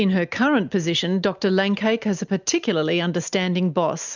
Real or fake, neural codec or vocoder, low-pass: real; none; 7.2 kHz